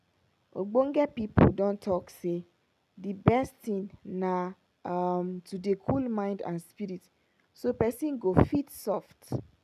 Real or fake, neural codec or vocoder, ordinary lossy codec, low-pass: real; none; none; 14.4 kHz